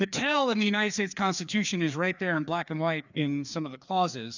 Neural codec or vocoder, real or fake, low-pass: codec, 16 kHz, 2 kbps, FreqCodec, larger model; fake; 7.2 kHz